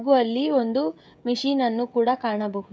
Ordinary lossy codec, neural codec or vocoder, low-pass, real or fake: none; codec, 16 kHz, 16 kbps, FreqCodec, smaller model; none; fake